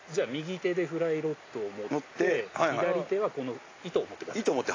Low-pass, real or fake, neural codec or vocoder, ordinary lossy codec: 7.2 kHz; real; none; AAC, 32 kbps